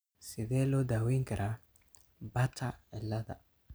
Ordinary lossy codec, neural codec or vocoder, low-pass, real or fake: none; none; none; real